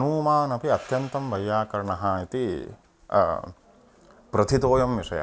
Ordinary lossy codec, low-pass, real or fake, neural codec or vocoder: none; none; real; none